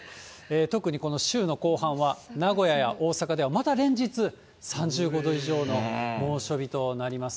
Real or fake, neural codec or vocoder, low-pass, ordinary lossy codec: real; none; none; none